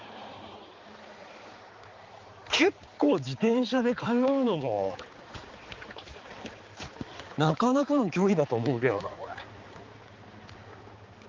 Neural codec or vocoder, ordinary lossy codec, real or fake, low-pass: codec, 16 kHz, 4 kbps, X-Codec, HuBERT features, trained on balanced general audio; Opus, 32 kbps; fake; 7.2 kHz